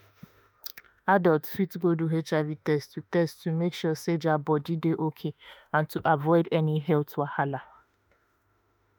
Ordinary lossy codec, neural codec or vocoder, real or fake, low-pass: none; autoencoder, 48 kHz, 32 numbers a frame, DAC-VAE, trained on Japanese speech; fake; none